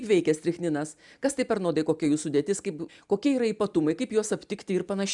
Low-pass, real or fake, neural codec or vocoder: 10.8 kHz; real; none